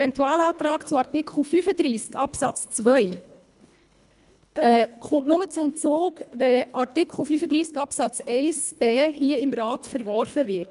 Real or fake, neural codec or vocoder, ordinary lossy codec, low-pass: fake; codec, 24 kHz, 1.5 kbps, HILCodec; MP3, 96 kbps; 10.8 kHz